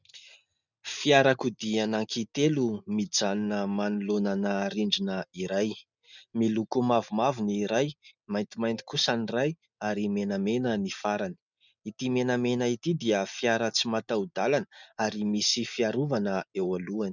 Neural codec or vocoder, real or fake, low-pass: none; real; 7.2 kHz